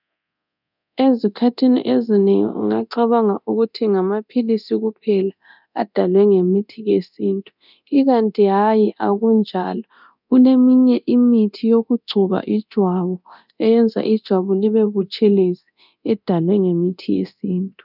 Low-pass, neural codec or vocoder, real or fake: 5.4 kHz; codec, 24 kHz, 0.9 kbps, DualCodec; fake